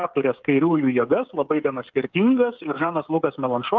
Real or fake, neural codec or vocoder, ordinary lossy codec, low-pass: fake; codec, 16 kHz, 16 kbps, FreqCodec, smaller model; Opus, 32 kbps; 7.2 kHz